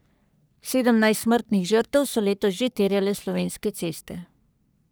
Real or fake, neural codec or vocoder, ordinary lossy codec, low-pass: fake; codec, 44.1 kHz, 3.4 kbps, Pupu-Codec; none; none